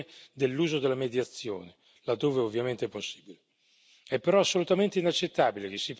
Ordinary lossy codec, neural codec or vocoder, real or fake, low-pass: none; none; real; none